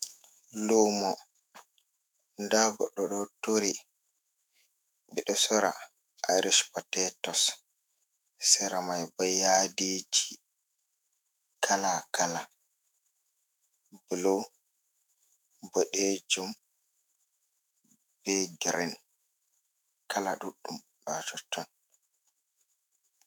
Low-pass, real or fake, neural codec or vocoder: 19.8 kHz; fake; autoencoder, 48 kHz, 128 numbers a frame, DAC-VAE, trained on Japanese speech